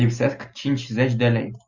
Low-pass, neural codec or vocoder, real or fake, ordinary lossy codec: 7.2 kHz; none; real; Opus, 64 kbps